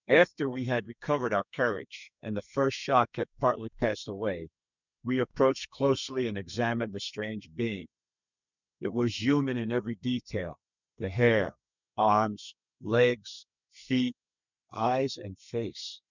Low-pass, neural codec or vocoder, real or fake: 7.2 kHz; codec, 32 kHz, 1.9 kbps, SNAC; fake